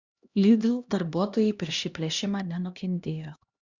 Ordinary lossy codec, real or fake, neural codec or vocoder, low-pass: Opus, 64 kbps; fake; codec, 16 kHz, 1 kbps, X-Codec, HuBERT features, trained on LibriSpeech; 7.2 kHz